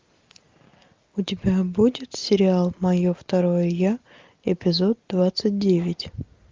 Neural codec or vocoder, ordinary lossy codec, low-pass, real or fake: none; Opus, 24 kbps; 7.2 kHz; real